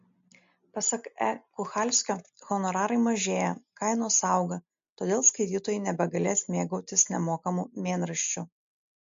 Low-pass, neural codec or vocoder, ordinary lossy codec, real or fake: 7.2 kHz; none; MP3, 48 kbps; real